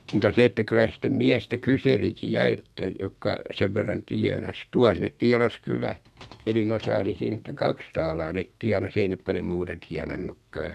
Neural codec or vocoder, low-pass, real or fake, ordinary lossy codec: codec, 32 kHz, 1.9 kbps, SNAC; 14.4 kHz; fake; none